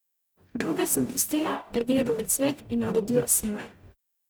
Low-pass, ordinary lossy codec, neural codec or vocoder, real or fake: none; none; codec, 44.1 kHz, 0.9 kbps, DAC; fake